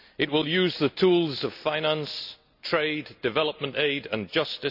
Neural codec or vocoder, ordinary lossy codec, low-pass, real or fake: none; none; 5.4 kHz; real